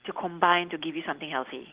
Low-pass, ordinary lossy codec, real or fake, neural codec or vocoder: 3.6 kHz; Opus, 16 kbps; real; none